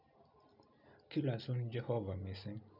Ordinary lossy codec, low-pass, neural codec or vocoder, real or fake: none; 5.4 kHz; none; real